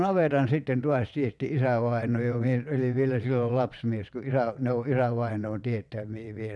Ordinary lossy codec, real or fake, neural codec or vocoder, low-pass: none; fake; vocoder, 22.05 kHz, 80 mel bands, WaveNeXt; none